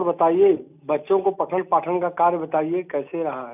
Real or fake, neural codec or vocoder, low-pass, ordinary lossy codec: real; none; 3.6 kHz; none